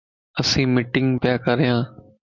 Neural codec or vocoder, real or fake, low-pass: none; real; 7.2 kHz